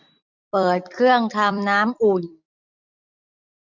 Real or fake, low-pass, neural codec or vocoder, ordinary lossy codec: fake; 7.2 kHz; vocoder, 44.1 kHz, 128 mel bands every 256 samples, BigVGAN v2; none